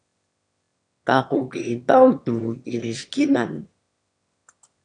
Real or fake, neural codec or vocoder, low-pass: fake; autoencoder, 22.05 kHz, a latent of 192 numbers a frame, VITS, trained on one speaker; 9.9 kHz